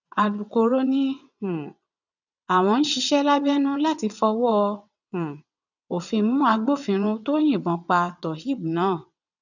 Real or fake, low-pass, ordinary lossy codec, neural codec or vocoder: fake; 7.2 kHz; none; vocoder, 22.05 kHz, 80 mel bands, WaveNeXt